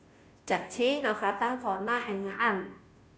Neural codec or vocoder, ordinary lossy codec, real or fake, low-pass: codec, 16 kHz, 0.5 kbps, FunCodec, trained on Chinese and English, 25 frames a second; none; fake; none